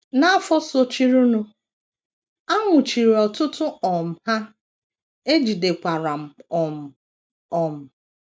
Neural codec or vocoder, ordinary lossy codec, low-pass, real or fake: none; none; none; real